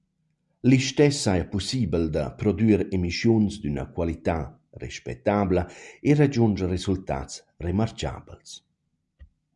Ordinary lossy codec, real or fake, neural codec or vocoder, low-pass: MP3, 96 kbps; real; none; 10.8 kHz